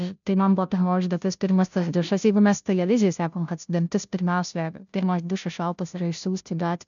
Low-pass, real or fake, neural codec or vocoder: 7.2 kHz; fake; codec, 16 kHz, 0.5 kbps, FunCodec, trained on Chinese and English, 25 frames a second